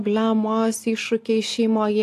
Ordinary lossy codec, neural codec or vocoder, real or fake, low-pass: AAC, 64 kbps; none; real; 14.4 kHz